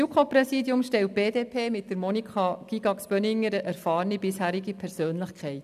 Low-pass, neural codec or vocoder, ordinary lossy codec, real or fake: 14.4 kHz; none; none; real